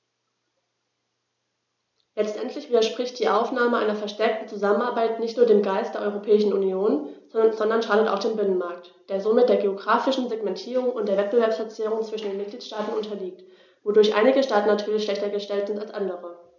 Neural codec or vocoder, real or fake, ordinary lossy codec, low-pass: none; real; none; 7.2 kHz